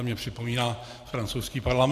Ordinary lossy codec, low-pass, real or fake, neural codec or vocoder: AAC, 64 kbps; 14.4 kHz; fake; vocoder, 44.1 kHz, 128 mel bands every 512 samples, BigVGAN v2